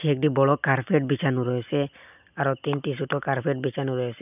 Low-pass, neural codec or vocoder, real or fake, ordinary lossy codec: 3.6 kHz; none; real; none